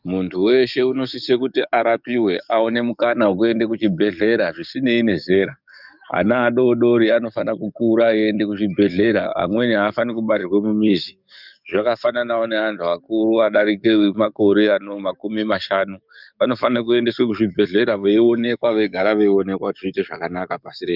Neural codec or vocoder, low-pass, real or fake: codec, 44.1 kHz, 7.8 kbps, DAC; 5.4 kHz; fake